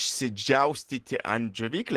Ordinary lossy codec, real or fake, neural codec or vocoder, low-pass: Opus, 16 kbps; real; none; 14.4 kHz